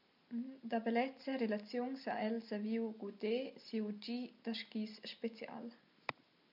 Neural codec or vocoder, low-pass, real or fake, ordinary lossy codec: none; 5.4 kHz; real; MP3, 48 kbps